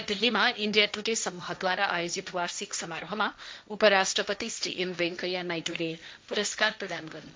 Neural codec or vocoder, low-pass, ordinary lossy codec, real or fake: codec, 16 kHz, 1.1 kbps, Voila-Tokenizer; none; none; fake